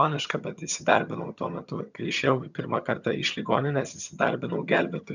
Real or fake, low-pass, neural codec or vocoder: fake; 7.2 kHz; vocoder, 22.05 kHz, 80 mel bands, HiFi-GAN